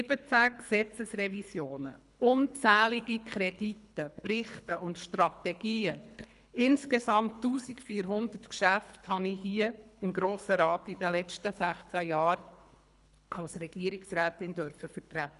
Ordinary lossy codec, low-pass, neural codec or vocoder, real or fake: none; 10.8 kHz; codec, 24 kHz, 3 kbps, HILCodec; fake